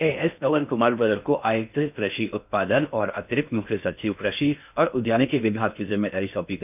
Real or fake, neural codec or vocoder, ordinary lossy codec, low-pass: fake; codec, 16 kHz in and 24 kHz out, 0.6 kbps, FocalCodec, streaming, 4096 codes; none; 3.6 kHz